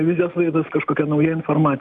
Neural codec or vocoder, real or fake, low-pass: none; real; 10.8 kHz